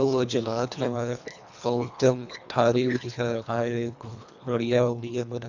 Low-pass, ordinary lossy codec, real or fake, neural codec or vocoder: 7.2 kHz; none; fake; codec, 24 kHz, 1.5 kbps, HILCodec